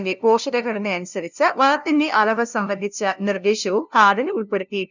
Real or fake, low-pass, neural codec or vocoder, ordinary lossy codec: fake; 7.2 kHz; codec, 16 kHz, 0.5 kbps, FunCodec, trained on LibriTTS, 25 frames a second; none